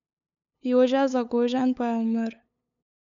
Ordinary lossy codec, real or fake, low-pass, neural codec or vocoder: none; fake; 7.2 kHz; codec, 16 kHz, 8 kbps, FunCodec, trained on LibriTTS, 25 frames a second